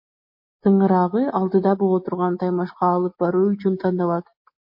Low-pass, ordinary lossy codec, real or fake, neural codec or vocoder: 5.4 kHz; MP3, 32 kbps; real; none